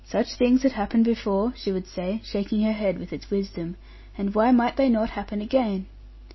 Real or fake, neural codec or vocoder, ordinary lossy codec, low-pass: real; none; MP3, 24 kbps; 7.2 kHz